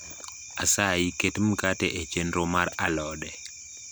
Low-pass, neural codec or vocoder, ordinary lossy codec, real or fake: none; none; none; real